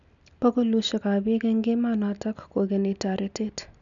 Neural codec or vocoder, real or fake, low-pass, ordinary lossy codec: none; real; 7.2 kHz; none